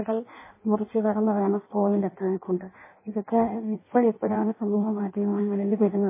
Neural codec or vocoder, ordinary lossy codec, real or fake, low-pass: codec, 16 kHz in and 24 kHz out, 0.6 kbps, FireRedTTS-2 codec; MP3, 16 kbps; fake; 3.6 kHz